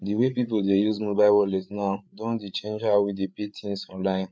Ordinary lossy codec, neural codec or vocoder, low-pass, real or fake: none; codec, 16 kHz, 8 kbps, FreqCodec, larger model; none; fake